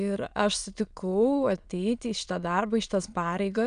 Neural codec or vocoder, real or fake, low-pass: autoencoder, 22.05 kHz, a latent of 192 numbers a frame, VITS, trained on many speakers; fake; 9.9 kHz